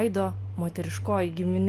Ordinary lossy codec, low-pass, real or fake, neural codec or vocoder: Opus, 24 kbps; 14.4 kHz; real; none